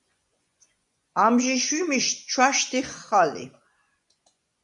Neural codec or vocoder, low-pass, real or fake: vocoder, 24 kHz, 100 mel bands, Vocos; 10.8 kHz; fake